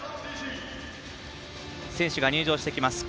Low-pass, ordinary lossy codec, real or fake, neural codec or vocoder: none; none; real; none